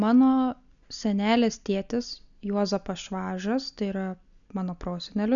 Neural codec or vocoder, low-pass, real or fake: none; 7.2 kHz; real